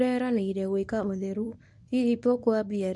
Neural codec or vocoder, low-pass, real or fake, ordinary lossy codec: codec, 24 kHz, 0.9 kbps, WavTokenizer, medium speech release version 1; none; fake; none